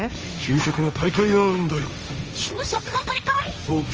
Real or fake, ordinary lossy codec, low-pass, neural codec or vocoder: fake; Opus, 24 kbps; 7.2 kHz; codec, 16 kHz, 1.1 kbps, Voila-Tokenizer